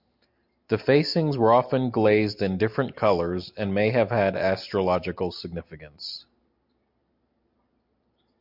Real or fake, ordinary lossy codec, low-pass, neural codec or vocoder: real; MP3, 48 kbps; 5.4 kHz; none